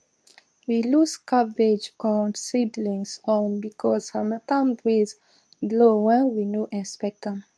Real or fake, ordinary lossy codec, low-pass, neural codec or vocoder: fake; none; none; codec, 24 kHz, 0.9 kbps, WavTokenizer, medium speech release version 2